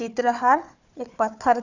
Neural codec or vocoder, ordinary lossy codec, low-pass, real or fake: codec, 16 kHz, 4 kbps, FunCodec, trained on Chinese and English, 50 frames a second; Opus, 64 kbps; 7.2 kHz; fake